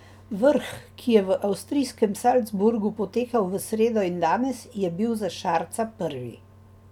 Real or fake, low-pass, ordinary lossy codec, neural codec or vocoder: real; 19.8 kHz; none; none